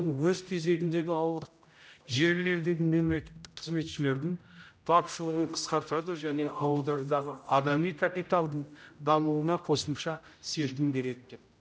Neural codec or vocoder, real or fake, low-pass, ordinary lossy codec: codec, 16 kHz, 0.5 kbps, X-Codec, HuBERT features, trained on general audio; fake; none; none